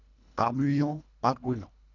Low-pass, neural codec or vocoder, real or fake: 7.2 kHz; codec, 24 kHz, 1.5 kbps, HILCodec; fake